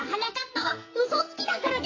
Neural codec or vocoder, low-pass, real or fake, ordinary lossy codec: codec, 44.1 kHz, 2.6 kbps, SNAC; 7.2 kHz; fake; AAC, 32 kbps